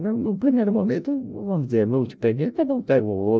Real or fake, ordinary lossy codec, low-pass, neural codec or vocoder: fake; none; none; codec, 16 kHz, 0.5 kbps, FreqCodec, larger model